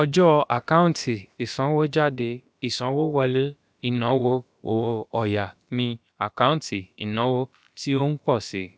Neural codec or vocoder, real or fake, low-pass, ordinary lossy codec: codec, 16 kHz, about 1 kbps, DyCAST, with the encoder's durations; fake; none; none